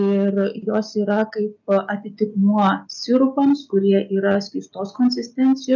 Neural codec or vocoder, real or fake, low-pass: none; real; 7.2 kHz